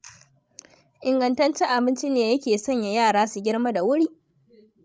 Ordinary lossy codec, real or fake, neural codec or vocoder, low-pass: none; fake; codec, 16 kHz, 16 kbps, FreqCodec, larger model; none